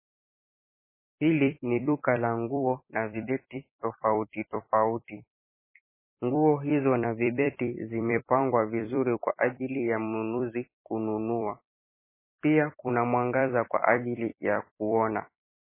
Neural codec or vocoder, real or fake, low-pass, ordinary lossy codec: vocoder, 44.1 kHz, 128 mel bands every 256 samples, BigVGAN v2; fake; 3.6 kHz; MP3, 16 kbps